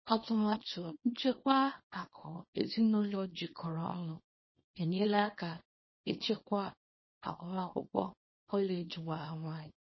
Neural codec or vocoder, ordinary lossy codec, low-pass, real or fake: codec, 24 kHz, 0.9 kbps, WavTokenizer, small release; MP3, 24 kbps; 7.2 kHz; fake